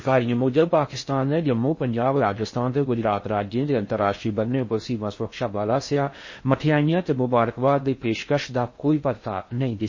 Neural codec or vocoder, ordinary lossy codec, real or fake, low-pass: codec, 16 kHz in and 24 kHz out, 0.6 kbps, FocalCodec, streaming, 4096 codes; MP3, 32 kbps; fake; 7.2 kHz